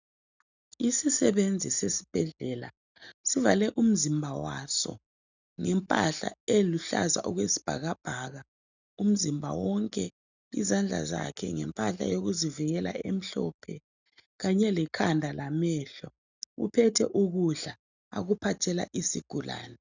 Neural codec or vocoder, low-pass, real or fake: none; 7.2 kHz; real